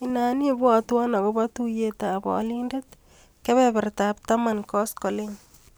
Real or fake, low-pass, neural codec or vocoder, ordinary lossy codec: real; none; none; none